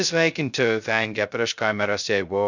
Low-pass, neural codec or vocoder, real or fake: 7.2 kHz; codec, 16 kHz, 0.2 kbps, FocalCodec; fake